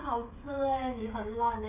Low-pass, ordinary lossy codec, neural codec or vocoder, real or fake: 3.6 kHz; none; codec, 16 kHz, 16 kbps, FreqCodec, smaller model; fake